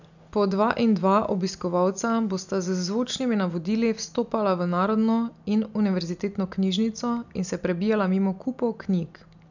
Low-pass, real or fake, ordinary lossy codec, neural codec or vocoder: 7.2 kHz; real; none; none